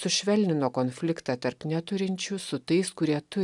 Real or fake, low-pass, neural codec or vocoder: real; 10.8 kHz; none